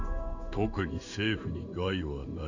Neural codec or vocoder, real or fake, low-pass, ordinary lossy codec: codec, 16 kHz in and 24 kHz out, 1 kbps, XY-Tokenizer; fake; 7.2 kHz; none